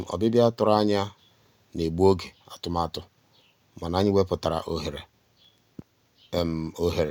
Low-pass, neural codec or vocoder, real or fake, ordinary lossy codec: 19.8 kHz; none; real; none